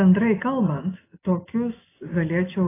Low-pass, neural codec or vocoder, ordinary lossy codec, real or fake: 3.6 kHz; none; AAC, 16 kbps; real